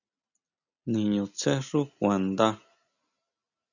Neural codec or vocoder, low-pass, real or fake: none; 7.2 kHz; real